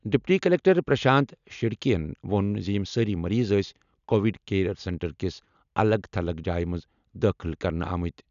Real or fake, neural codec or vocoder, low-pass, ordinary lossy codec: real; none; 7.2 kHz; none